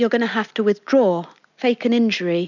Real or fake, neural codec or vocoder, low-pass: real; none; 7.2 kHz